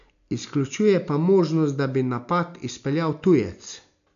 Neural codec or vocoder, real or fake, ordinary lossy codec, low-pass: none; real; none; 7.2 kHz